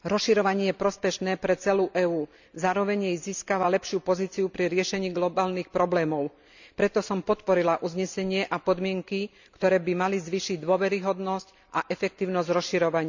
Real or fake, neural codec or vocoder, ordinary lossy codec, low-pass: real; none; none; 7.2 kHz